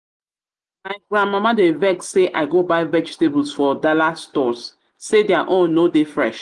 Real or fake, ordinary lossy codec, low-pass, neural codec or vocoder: real; Opus, 24 kbps; 10.8 kHz; none